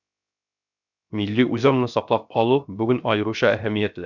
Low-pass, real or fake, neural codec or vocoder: 7.2 kHz; fake; codec, 16 kHz, 0.7 kbps, FocalCodec